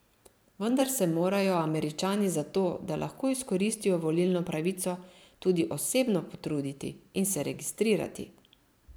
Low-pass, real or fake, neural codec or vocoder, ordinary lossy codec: none; fake; vocoder, 44.1 kHz, 128 mel bands every 512 samples, BigVGAN v2; none